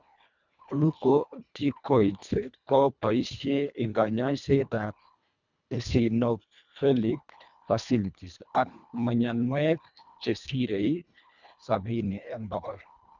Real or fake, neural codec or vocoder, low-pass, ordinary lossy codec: fake; codec, 24 kHz, 1.5 kbps, HILCodec; 7.2 kHz; none